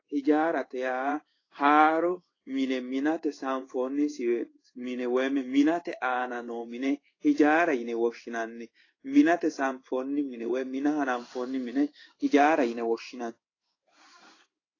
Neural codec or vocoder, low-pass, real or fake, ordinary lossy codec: codec, 16 kHz in and 24 kHz out, 1 kbps, XY-Tokenizer; 7.2 kHz; fake; AAC, 32 kbps